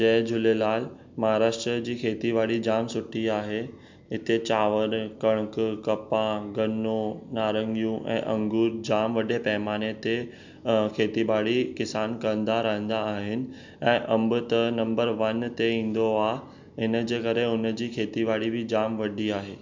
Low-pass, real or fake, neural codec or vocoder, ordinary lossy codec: 7.2 kHz; real; none; MP3, 64 kbps